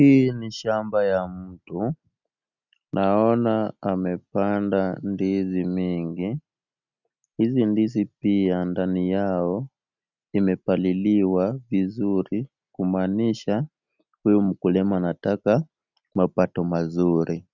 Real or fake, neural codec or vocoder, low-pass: real; none; 7.2 kHz